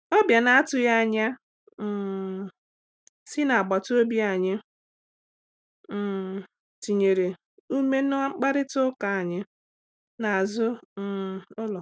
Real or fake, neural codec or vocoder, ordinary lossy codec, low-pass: real; none; none; none